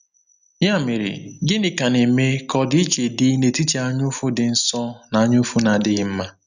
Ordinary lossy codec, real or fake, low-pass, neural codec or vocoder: none; real; 7.2 kHz; none